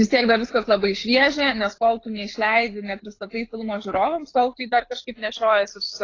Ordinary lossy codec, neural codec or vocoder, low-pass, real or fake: AAC, 32 kbps; codec, 24 kHz, 6 kbps, HILCodec; 7.2 kHz; fake